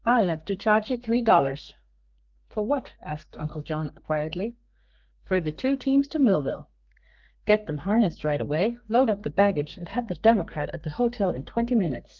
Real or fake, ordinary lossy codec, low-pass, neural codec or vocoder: fake; Opus, 24 kbps; 7.2 kHz; codec, 32 kHz, 1.9 kbps, SNAC